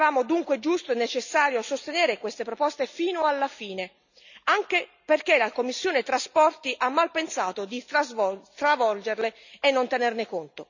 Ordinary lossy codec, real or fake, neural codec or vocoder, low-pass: none; real; none; 7.2 kHz